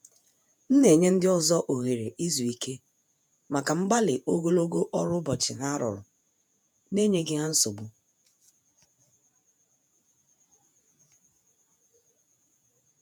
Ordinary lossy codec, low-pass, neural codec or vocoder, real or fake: none; none; vocoder, 48 kHz, 128 mel bands, Vocos; fake